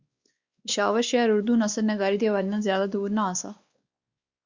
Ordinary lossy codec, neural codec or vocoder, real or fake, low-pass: Opus, 64 kbps; codec, 16 kHz, 2 kbps, X-Codec, WavLM features, trained on Multilingual LibriSpeech; fake; 7.2 kHz